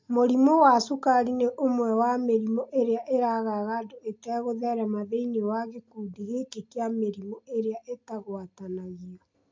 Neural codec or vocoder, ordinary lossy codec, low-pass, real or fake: none; MP3, 48 kbps; 7.2 kHz; real